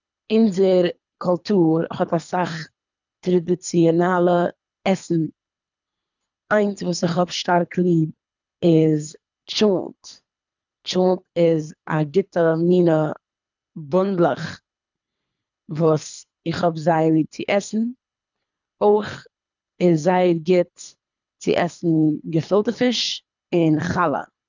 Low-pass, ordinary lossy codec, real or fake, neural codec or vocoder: 7.2 kHz; none; fake; codec, 24 kHz, 3 kbps, HILCodec